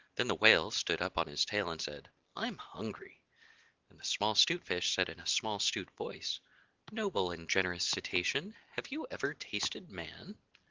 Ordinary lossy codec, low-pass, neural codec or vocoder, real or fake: Opus, 16 kbps; 7.2 kHz; none; real